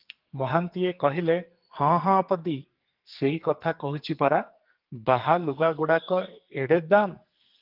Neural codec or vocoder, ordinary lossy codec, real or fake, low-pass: codec, 44.1 kHz, 2.6 kbps, SNAC; Opus, 24 kbps; fake; 5.4 kHz